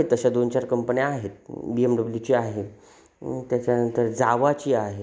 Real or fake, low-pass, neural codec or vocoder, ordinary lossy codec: real; none; none; none